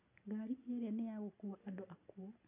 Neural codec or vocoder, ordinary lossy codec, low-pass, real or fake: none; AAC, 32 kbps; 3.6 kHz; real